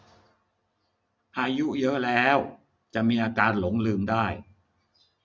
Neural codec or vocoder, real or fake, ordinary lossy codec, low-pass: none; real; none; none